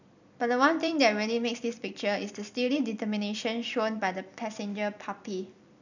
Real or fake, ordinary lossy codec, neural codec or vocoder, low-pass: fake; none; vocoder, 44.1 kHz, 128 mel bands every 256 samples, BigVGAN v2; 7.2 kHz